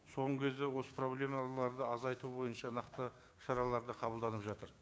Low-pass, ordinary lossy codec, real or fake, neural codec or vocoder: none; none; fake; codec, 16 kHz, 6 kbps, DAC